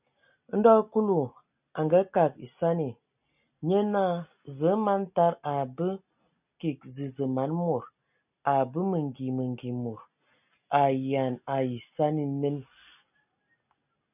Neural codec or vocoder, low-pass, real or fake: none; 3.6 kHz; real